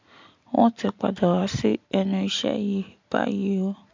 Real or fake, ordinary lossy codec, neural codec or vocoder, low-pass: real; MP3, 64 kbps; none; 7.2 kHz